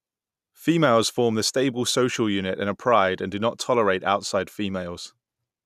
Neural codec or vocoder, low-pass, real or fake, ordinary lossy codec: none; 14.4 kHz; real; none